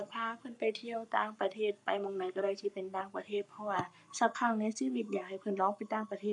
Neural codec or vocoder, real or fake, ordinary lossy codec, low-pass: codec, 44.1 kHz, 7.8 kbps, Pupu-Codec; fake; none; 10.8 kHz